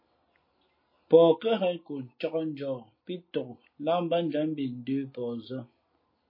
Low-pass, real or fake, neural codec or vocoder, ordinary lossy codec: 5.4 kHz; real; none; MP3, 24 kbps